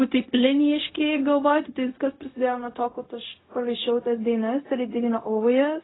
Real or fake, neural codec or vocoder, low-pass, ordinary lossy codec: fake; codec, 16 kHz, 0.4 kbps, LongCat-Audio-Codec; 7.2 kHz; AAC, 16 kbps